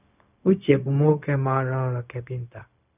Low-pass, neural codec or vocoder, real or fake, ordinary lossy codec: 3.6 kHz; codec, 16 kHz, 0.4 kbps, LongCat-Audio-Codec; fake; none